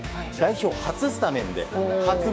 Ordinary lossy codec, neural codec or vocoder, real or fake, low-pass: none; codec, 16 kHz, 6 kbps, DAC; fake; none